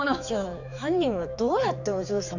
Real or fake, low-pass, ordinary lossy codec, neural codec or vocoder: fake; 7.2 kHz; none; codec, 16 kHz, 4 kbps, X-Codec, HuBERT features, trained on general audio